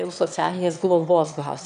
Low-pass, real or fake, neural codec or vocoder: 9.9 kHz; fake; autoencoder, 22.05 kHz, a latent of 192 numbers a frame, VITS, trained on one speaker